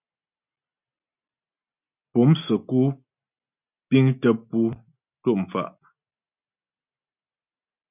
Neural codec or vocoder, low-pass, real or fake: none; 3.6 kHz; real